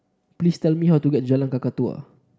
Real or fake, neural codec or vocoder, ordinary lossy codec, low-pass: real; none; none; none